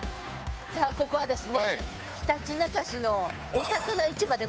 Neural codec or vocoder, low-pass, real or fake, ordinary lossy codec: codec, 16 kHz, 2 kbps, FunCodec, trained on Chinese and English, 25 frames a second; none; fake; none